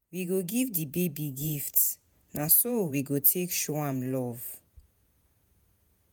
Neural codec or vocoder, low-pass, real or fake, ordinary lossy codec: vocoder, 48 kHz, 128 mel bands, Vocos; none; fake; none